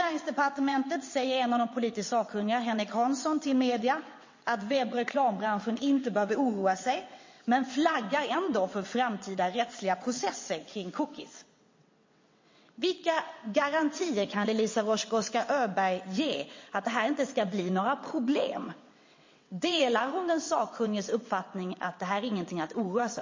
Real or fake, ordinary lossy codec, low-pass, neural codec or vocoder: fake; MP3, 32 kbps; 7.2 kHz; vocoder, 22.05 kHz, 80 mel bands, WaveNeXt